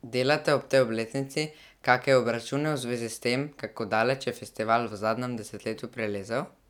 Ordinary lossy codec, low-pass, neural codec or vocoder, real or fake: none; 19.8 kHz; none; real